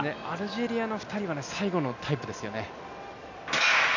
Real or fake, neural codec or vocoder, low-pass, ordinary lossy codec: real; none; 7.2 kHz; none